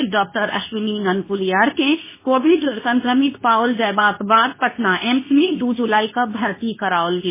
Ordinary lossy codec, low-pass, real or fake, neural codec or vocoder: MP3, 16 kbps; 3.6 kHz; fake; codec, 24 kHz, 0.9 kbps, WavTokenizer, medium speech release version 2